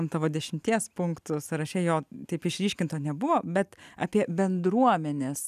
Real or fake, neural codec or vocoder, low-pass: real; none; 14.4 kHz